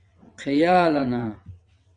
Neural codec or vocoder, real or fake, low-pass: vocoder, 22.05 kHz, 80 mel bands, WaveNeXt; fake; 9.9 kHz